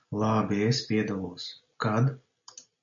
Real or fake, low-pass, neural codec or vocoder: real; 7.2 kHz; none